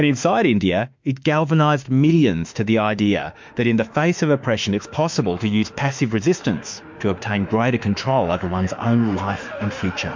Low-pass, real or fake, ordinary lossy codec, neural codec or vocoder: 7.2 kHz; fake; MP3, 64 kbps; autoencoder, 48 kHz, 32 numbers a frame, DAC-VAE, trained on Japanese speech